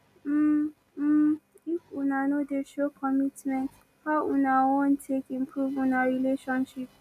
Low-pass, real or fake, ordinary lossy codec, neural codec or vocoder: 14.4 kHz; real; none; none